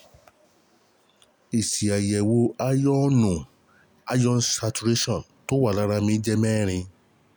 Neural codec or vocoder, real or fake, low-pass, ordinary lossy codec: none; real; none; none